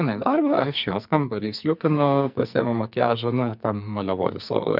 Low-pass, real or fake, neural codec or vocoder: 5.4 kHz; fake; codec, 44.1 kHz, 2.6 kbps, SNAC